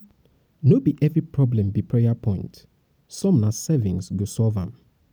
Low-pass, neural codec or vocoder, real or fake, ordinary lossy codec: none; none; real; none